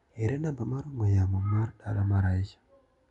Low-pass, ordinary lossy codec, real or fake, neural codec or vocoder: 10.8 kHz; none; real; none